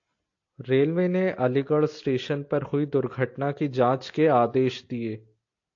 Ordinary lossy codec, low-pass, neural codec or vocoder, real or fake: AAC, 48 kbps; 7.2 kHz; none; real